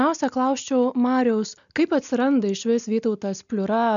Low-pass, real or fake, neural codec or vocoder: 7.2 kHz; real; none